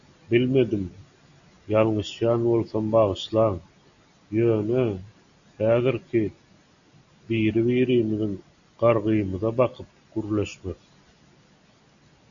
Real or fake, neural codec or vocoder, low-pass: real; none; 7.2 kHz